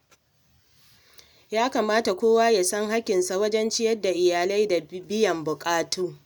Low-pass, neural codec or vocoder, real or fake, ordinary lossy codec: none; none; real; none